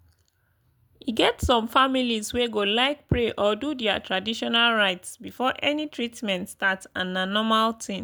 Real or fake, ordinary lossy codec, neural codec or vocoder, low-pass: real; none; none; none